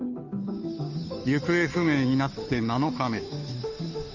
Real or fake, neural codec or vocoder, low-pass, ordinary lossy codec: fake; codec, 16 kHz, 2 kbps, FunCodec, trained on Chinese and English, 25 frames a second; 7.2 kHz; none